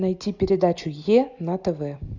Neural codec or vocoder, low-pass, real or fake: none; 7.2 kHz; real